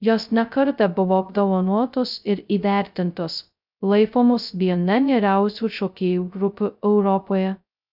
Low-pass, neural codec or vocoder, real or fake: 5.4 kHz; codec, 16 kHz, 0.2 kbps, FocalCodec; fake